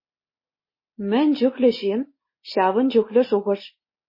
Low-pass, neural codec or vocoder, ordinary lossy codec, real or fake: 5.4 kHz; none; MP3, 24 kbps; real